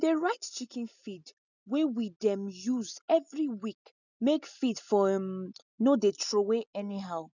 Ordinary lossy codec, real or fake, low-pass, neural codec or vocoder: none; real; 7.2 kHz; none